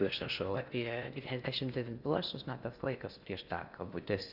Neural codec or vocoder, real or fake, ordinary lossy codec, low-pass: codec, 16 kHz in and 24 kHz out, 0.6 kbps, FocalCodec, streaming, 4096 codes; fake; Opus, 64 kbps; 5.4 kHz